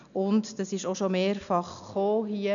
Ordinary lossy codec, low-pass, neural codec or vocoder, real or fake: none; 7.2 kHz; none; real